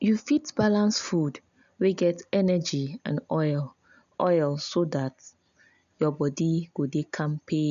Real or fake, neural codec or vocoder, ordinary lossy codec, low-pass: real; none; none; 7.2 kHz